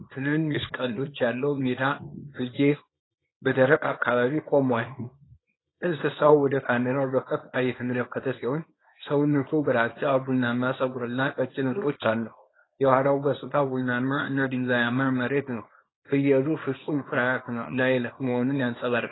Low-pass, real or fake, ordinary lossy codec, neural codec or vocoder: 7.2 kHz; fake; AAC, 16 kbps; codec, 24 kHz, 0.9 kbps, WavTokenizer, small release